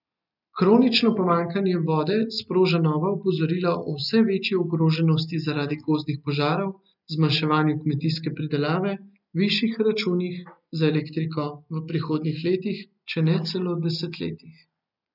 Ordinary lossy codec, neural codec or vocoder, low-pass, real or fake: none; none; 5.4 kHz; real